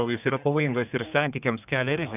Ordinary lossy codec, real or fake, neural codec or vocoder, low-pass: AAC, 32 kbps; fake; codec, 32 kHz, 1.9 kbps, SNAC; 3.6 kHz